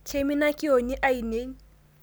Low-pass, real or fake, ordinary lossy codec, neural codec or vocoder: none; real; none; none